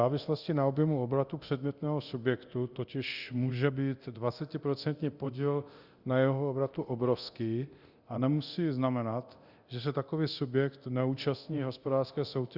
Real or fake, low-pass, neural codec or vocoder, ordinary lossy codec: fake; 5.4 kHz; codec, 24 kHz, 0.9 kbps, DualCodec; Opus, 64 kbps